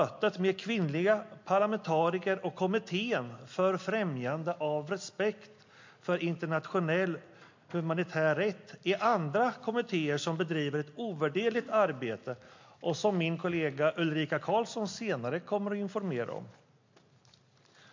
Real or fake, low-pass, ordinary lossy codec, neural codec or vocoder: real; 7.2 kHz; MP3, 48 kbps; none